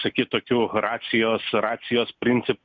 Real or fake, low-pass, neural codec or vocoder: real; 7.2 kHz; none